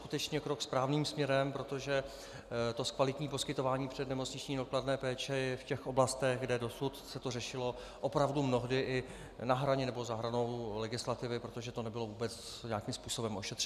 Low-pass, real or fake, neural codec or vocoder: 14.4 kHz; real; none